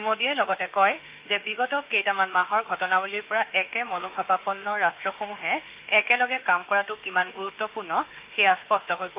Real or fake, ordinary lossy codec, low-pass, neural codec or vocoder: fake; Opus, 32 kbps; 3.6 kHz; autoencoder, 48 kHz, 32 numbers a frame, DAC-VAE, trained on Japanese speech